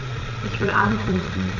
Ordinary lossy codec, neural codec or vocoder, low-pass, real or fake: none; vocoder, 22.05 kHz, 80 mel bands, Vocos; 7.2 kHz; fake